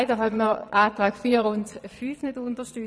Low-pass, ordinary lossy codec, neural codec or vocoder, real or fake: none; none; vocoder, 22.05 kHz, 80 mel bands, Vocos; fake